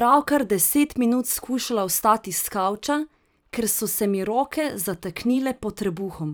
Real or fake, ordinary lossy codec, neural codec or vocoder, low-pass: fake; none; vocoder, 44.1 kHz, 128 mel bands every 512 samples, BigVGAN v2; none